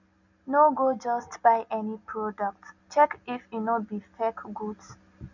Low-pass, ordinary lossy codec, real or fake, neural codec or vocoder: 7.2 kHz; none; real; none